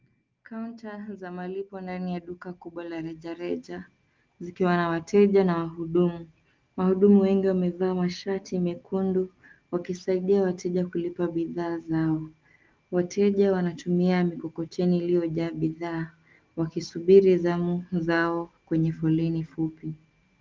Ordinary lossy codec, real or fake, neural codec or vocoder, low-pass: Opus, 32 kbps; real; none; 7.2 kHz